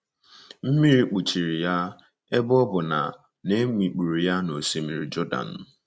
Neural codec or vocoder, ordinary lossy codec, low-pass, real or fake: none; none; none; real